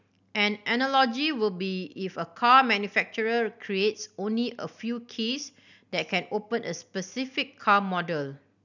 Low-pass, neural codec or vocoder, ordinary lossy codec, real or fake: 7.2 kHz; none; none; real